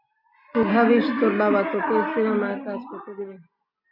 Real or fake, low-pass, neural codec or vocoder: real; 5.4 kHz; none